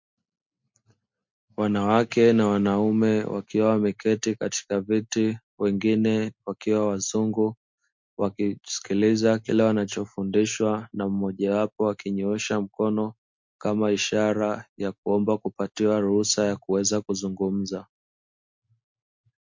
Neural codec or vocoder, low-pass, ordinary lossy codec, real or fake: none; 7.2 kHz; MP3, 48 kbps; real